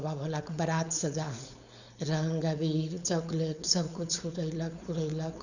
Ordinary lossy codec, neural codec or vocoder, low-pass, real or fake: none; codec, 16 kHz, 4.8 kbps, FACodec; 7.2 kHz; fake